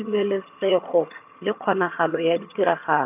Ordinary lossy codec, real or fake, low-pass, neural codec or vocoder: none; fake; 3.6 kHz; vocoder, 22.05 kHz, 80 mel bands, HiFi-GAN